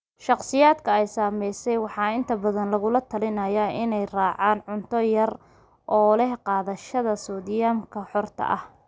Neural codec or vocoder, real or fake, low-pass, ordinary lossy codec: none; real; none; none